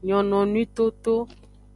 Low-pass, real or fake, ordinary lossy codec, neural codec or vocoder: 10.8 kHz; real; MP3, 96 kbps; none